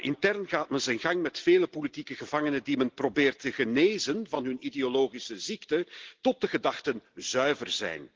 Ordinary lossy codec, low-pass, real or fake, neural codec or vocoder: Opus, 16 kbps; 7.2 kHz; real; none